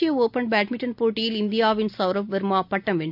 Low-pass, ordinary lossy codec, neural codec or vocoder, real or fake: 5.4 kHz; none; none; real